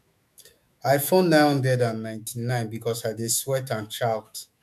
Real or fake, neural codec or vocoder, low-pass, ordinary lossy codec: fake; autoencoder, 48 kHz, 128 numbers a frame, DAC-VAE, trained on Japanese speech; 14.4 kHz; none